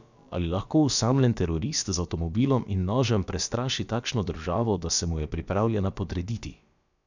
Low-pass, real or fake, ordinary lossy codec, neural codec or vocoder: 7.2 kHz; fake; none; codec, 16 kHz, about 1 kbps, DyCAST, with the encoder's durations